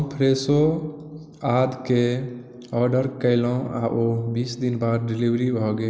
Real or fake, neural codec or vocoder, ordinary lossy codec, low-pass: real; none; none; none